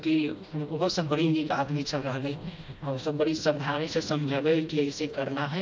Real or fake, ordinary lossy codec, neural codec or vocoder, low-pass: fake; none; codec, 16 kHz, 1 kbps, FreqCodec, smaller model; none